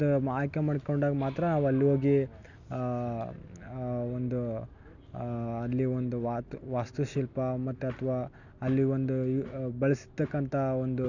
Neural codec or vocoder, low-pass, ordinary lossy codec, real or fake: none; 7.2 kHz; none; real